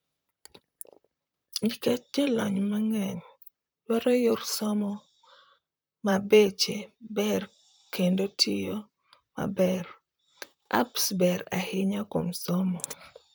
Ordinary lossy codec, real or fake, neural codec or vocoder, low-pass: none; fake; vocoder, 44.1 kHz, 128 mel bands, Pupu-Vocoder; none